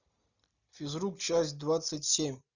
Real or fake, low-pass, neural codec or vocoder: real; 7.2 kHz; none